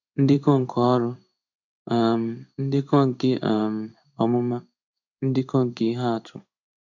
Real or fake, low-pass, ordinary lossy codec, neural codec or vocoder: fake; 7.2 kHz; none; codec, 16 kHz in and 24 kHz out, 1 kbps, XY-Tokenizer